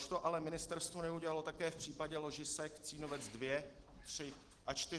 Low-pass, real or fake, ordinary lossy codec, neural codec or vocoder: 10.8 kHz; real; Opus, 16 kbps; none